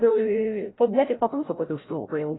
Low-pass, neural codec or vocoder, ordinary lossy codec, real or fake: 7.2 kHz; codec, 16 kHz, 0.5 kbps, FreqCodec, larger model; AAC, 16 kbps; fake